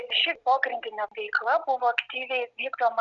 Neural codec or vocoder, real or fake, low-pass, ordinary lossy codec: none; real; 7.2 kHz; Opus, 64 kbps